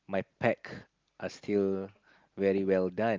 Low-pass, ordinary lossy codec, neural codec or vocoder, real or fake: 7.2 kHz; Opus, 32 kbps; none; real